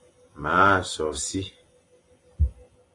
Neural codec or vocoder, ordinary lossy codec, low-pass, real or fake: none; AAC, 48 kbps; 10.8 kHz; real